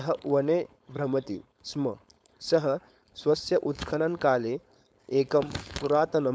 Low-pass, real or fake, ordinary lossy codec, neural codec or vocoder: none; fake; none; codec, 16 kHz, 4.8 kbps, FACodec